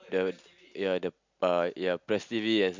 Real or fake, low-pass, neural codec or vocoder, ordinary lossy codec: real; 7.2 kHz; none; MP3, 64 kbps